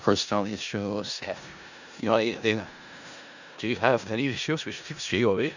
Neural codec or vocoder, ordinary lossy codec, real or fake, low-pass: codec, 16 kHz in and 24 kHz out, 0.4 kbps, LongCat-Audio-Codec, four codebook decoder; none; fake; 7.2 kHz